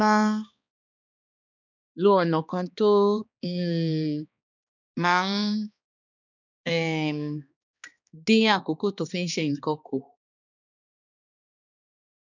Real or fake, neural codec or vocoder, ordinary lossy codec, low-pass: fake; codec, 16 kHz, 2 kbps, X-Codec, HuBERT features, trained on balanced general audio; none; 7.2 kHz